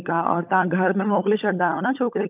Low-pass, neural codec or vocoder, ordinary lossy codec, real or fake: 3.6 kHz; codec, 16 kHz, 16 kbps, FunCodec, trained on LibriTTS, 50 frames a second; none; fake